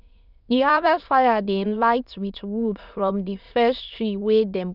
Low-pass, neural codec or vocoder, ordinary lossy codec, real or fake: 5.4 kHz; autoencoder, 22.05 kHz, a latent of 192 numbers a frame, VITS, trained on many speakers; none; fake